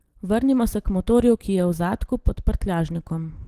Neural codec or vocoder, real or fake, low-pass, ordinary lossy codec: none; real; 14.4 kHz; Opus, 16 kbps